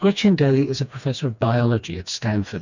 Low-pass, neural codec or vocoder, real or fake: 7.2 kHz; codec, 16 kHz, 2 kbps, FreqCodec, smaller model; fake